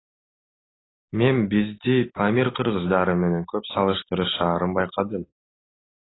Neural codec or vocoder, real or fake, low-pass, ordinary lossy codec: none; real; 7.2 kHz; AAC, 16 kbps